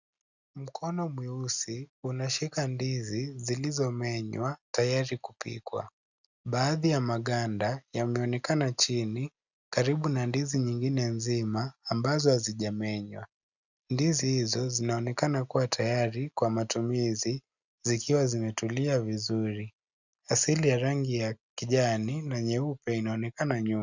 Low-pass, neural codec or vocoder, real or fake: 7.2 kHz; none; real